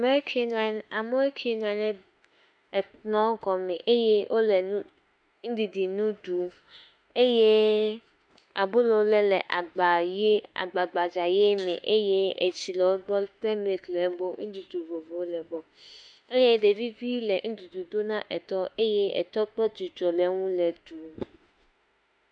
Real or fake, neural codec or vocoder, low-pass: fake; autoencoder, 48 kHz, 32 numbers a frame, DAC-VAE, trained on Japanese speech; 9.9 kHz